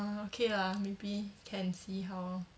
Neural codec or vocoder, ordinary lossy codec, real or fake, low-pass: none; none; real; none